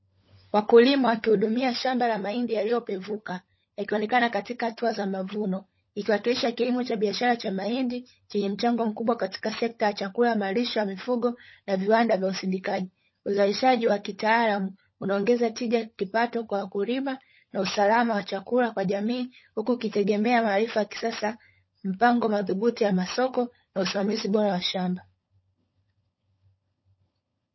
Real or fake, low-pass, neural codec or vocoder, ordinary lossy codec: fake; 7.2 kHz; codec, 16 kHz, 16 kbps, FunCodec, trained on LibriTTS, 50 frames a second; MP3, 24 kbps